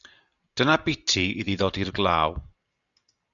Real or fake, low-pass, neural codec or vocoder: real; 7.2 kHz; none